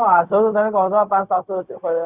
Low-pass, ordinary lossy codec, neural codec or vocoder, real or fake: 3.6 kHz; Opus, 64 kbps; codec, 16 kHz, 0.4 kbps, LongCat-Audio-Codec; fake